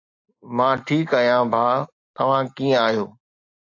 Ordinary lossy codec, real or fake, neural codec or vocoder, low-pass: AAC, 48 kbps; real; none; 7.2 kHz